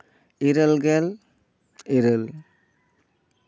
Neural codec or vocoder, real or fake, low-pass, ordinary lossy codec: none; real; none; none